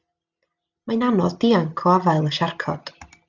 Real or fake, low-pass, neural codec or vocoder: real; 7.2 kHz; none